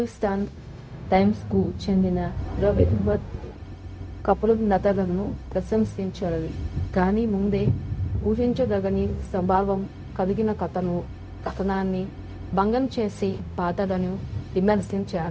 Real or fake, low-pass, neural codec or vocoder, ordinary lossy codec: fake; none; codec, 16 kHz, 0.4 kbps, LongCat-Audio-Codec; none